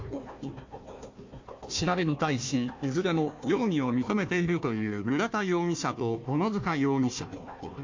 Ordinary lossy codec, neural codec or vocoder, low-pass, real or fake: MP3, 48 kbps; codec, 16 kHz, 1 kbps, FunCodec, trained on Chinese and English, 50 frames a second; 7.2 kHz; fake